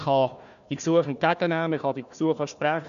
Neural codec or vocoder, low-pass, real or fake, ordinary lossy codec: codec, 16 kHz, 1 kbps, FunCodec, trained on Chinese and English, 50 frames a second; 7.2 kHz; fake; none